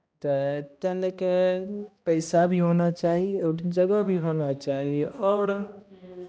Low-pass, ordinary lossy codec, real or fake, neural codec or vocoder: none; none; fake; codec, 16 kHz, 1 kbps, X-Codec, HuBERT features, trained on balanced general audio